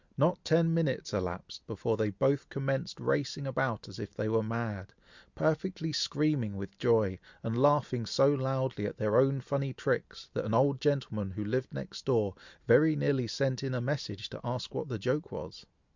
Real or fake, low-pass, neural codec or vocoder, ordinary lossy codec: real; 7.2 kHz; none; Opus, 64 kbps